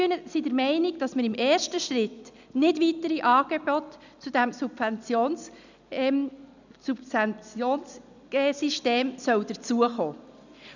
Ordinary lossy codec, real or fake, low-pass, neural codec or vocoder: none; real; 7.2 kHz; none